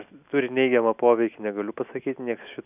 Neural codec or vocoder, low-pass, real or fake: none; 3.6 kHz; real